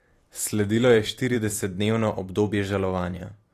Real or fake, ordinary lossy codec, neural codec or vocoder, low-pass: fake; AAC, 48 kbps; vocoder, 44.1 kHz, 128 mel bands every 512 samples, BigVGAN v2; 14.4 kHz